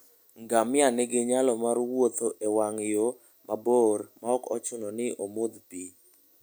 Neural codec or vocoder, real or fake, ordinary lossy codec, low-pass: none; real; none; none